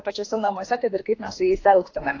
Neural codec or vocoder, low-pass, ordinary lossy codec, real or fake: autoencoder, 48 kHz, 32 numbers a frame, DAC-VAE, trained on Japanese speech; 7.2 kHz; AAC, 32 kbps; fake